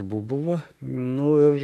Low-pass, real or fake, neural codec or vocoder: 14.4 kHz; fake; autoencoder, 48 kHz, 32 numbers a frame, DAC-VAE, trained on Japanese speech